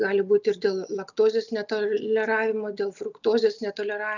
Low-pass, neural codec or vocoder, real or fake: 7.2 kHz; none; real